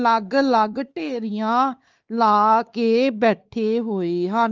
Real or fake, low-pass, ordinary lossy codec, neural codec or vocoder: fake; 7.2 kHz; Opus, 24 kbps; codec, 16 kHz, 4 kbps, X-Codec, WavLM features, trained on Multilingual LibriSpeech